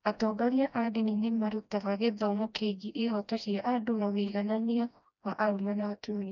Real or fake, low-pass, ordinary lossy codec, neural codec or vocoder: fake; 7.2 kHz; none; codec, 16 kHz, 1 kbps, FreqCodec, smaller model